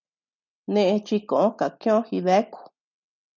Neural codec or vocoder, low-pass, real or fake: none; 7.2 kHz; real